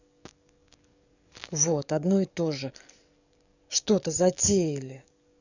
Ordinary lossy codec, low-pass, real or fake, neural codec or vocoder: none; 7.2 kHz; fake; codec, 44.1 kHz, 7.8 kbps, DAC